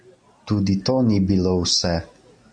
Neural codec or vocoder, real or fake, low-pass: none; real; 9.9 kHz